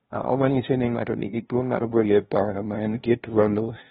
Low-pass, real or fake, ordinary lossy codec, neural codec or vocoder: 7.2 kHz; fake; AAC, 16 kbps; codec, 16 kHz, 0.5 kbps, FunCodec, trained on LibriTTS, 25 frames a second